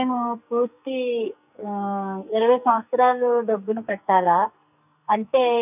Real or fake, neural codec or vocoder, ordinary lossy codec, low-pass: fake; codec, 44.1 kHz, 2.6 kbps, SNAC; none; 3.6 kHz